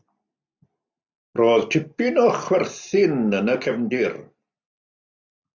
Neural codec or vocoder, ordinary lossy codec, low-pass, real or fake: none; MP3, 64 kbps; 7.2 kHz; real